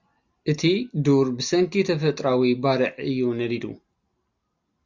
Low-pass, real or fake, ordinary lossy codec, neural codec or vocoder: 7.2 kHz; real; Opus, 64 kbps; none